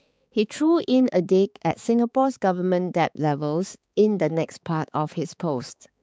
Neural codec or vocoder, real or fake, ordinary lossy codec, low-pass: codec, 16 kHz, 4 kbps, X-Codec, HuBERT features, trained on balanced general audio; fake; none; none